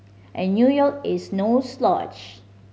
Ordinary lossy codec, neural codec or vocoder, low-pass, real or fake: none; none; none; real